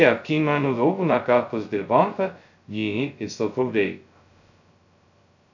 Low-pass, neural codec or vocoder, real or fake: 7.2 kHz; codec, 16 kHz, 0.2 kbps, FocalCodec; fake